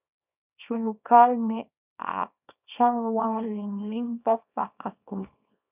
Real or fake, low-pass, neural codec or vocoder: fake; 3.6 kHz; codec, 24 kHz, 0.9 kbps, WavTokenizer, small release